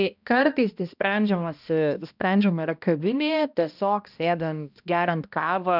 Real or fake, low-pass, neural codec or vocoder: fake; 5.4 kHz; codec, 16 kHz, 1 kbps, X-Codec, HuBERT features, trained on balanced general audio